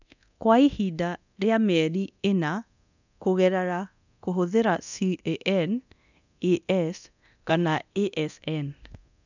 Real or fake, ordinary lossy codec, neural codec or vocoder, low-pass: fake; none; codec, 24 kHz, 0.9 kbps, DualCodec; 7.2 kHz